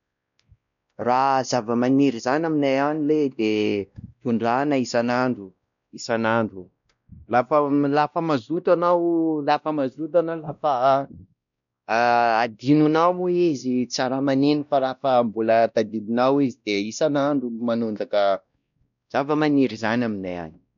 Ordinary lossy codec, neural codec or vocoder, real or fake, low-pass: none; codec, 16 kHz, 1 kbps, X-Codec, WavLM features, trained on Multilingual LibriSpeech; fake; 7.2 kHz